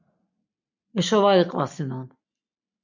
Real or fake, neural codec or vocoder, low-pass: real; none; 7.2 kHz